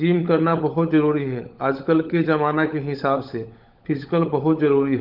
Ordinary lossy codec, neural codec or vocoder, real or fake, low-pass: Opus, 32 kbps; codec, 16 kHz, 16 kbps, FunCodec, trained on LibriTTS, 50 frames a second; fake; 5.4 kHz